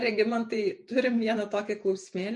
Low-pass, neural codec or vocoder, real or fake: 10.8 kHz; vocoder, 48 kHz, 128 mel bands, Vocos; fake